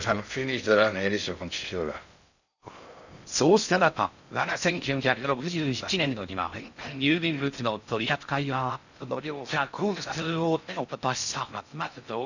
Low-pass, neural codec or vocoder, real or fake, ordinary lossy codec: 7.2 kHz; codec, 16 kHz in and 24 kHz out, 0.6 kbps, FocalCodec, streaming, 2048 codes; fake; none